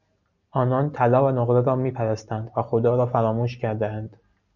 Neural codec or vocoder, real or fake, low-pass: none; real; 7.2 kHz